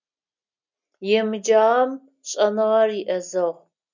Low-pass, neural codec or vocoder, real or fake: 7.2 kHz; none; real